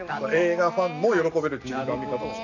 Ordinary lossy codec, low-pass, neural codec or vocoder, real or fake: none; 7.2 kHz; none; real